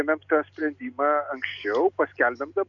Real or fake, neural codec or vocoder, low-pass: real; none; 7.2 kHz